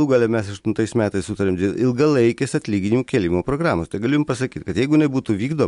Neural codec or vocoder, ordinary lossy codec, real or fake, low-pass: none; MP3, 64 kbps; real; 10.8 kHz